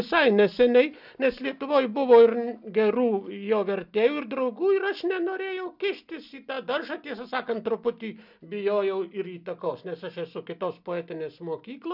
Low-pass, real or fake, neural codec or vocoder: 5.4 kHz; real; none